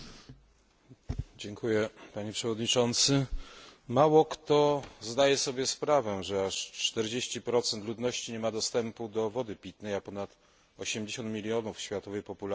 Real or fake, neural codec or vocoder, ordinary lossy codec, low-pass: real; none; none; none